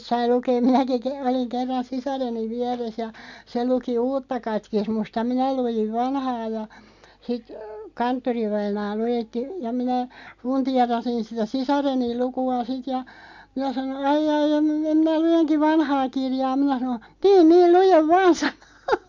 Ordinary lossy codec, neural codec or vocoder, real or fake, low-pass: MP3, 64 kbps; none; real; 7.2 kHz